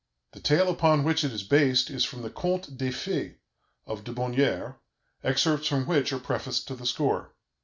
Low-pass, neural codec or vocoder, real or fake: 7.2 kHz; none; real